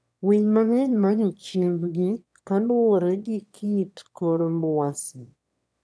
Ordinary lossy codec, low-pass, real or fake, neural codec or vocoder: none; none; fake; autoencoder, 22.05 kHz, a latent of 192 numbers a frame, VITS, trained on one speaker